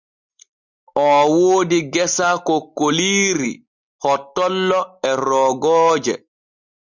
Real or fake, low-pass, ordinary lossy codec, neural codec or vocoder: real; 7.2 kHz; Opus, 64 kbps; none